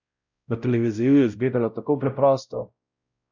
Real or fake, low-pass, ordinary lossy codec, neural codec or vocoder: fake; 7.2 kHz; none; codec, 16 kHz, 0.5 kbps, X-Codec, WavLM features, trained on Multilingual LibriSpeech